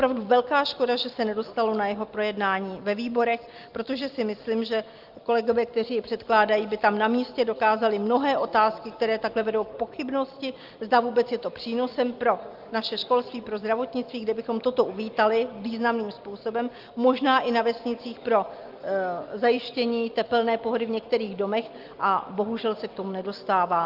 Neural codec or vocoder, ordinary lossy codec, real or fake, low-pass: none; Opus, 32 kbps; real; 5.4 kHz